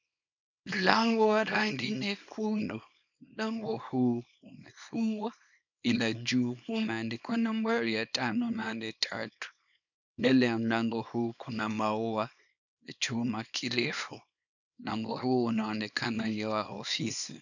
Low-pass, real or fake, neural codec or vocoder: 7.2 kHz; fake; codec, 24 kHz, 0.9 kbps, WavTokenizer, small release